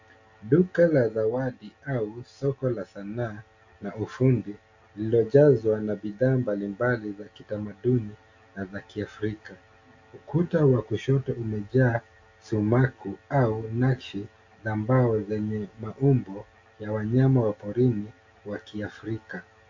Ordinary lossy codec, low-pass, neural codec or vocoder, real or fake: AAC, 48 kbps; 7.2 kHz; none; real